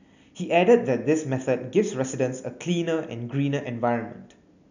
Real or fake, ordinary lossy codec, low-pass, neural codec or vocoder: real; none; 7.2 kHz; none